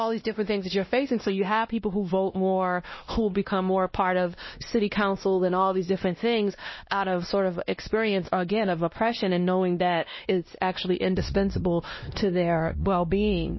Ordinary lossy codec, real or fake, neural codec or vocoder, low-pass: MP3, 24 kbps; fake; codec, 16 kHz, 1 kbps, X-Codec, WavLM features, trained on Multilingual LibriSpeech; 7.2 kHz